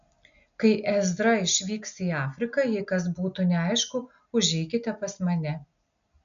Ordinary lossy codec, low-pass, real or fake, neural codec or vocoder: MP3, 96 kbps; 7.2 kHz; real; none